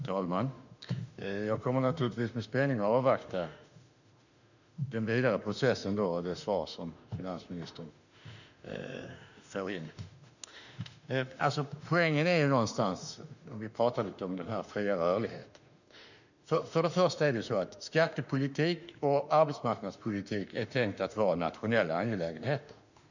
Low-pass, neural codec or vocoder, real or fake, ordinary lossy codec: 7.2 kHz; autoencoder, 48 kHz, 32 numbers a frame, DAC-VAE, trained on Japanese speech; fake; none